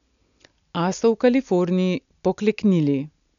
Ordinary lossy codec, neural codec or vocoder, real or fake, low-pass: none; none; real; 7.2 kHz